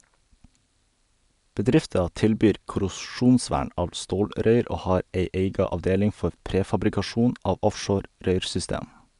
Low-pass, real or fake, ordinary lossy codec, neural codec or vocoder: 10.8 kHz; real; MP3, 96 kbps; none